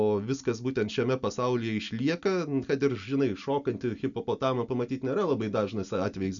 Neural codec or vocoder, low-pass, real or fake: none; 7.2 kHz; real